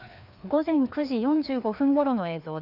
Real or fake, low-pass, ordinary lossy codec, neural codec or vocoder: fake; 5.4 kHz; none; codec, 16 kHz, 2 kbps, FreqCodec, larger model